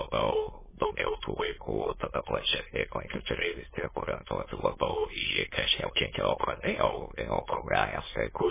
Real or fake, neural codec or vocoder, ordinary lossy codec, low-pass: fake; autoencoder, 22.05 kHz, a latent of 192 numbers a frame, VITS, trained on many speakers; MP3, 16 kbps; 3.6 kHz